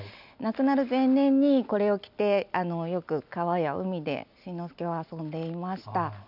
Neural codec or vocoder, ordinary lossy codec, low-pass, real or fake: none; none; 5.4 kHz; real